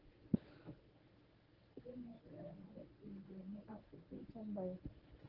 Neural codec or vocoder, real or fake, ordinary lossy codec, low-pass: codec, 24 kHz, 0.9 kbps, WavTokenizer, medium speech release version 1; fake; Opus, 16 kbps; 5.4 kHz